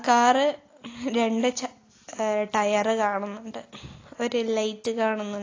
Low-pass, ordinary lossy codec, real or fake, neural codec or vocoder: 7.2 kHz; AAC, 32 kbps; real; none